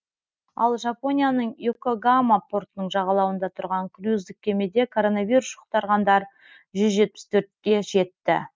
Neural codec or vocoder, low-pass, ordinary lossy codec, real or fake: none; none; none; real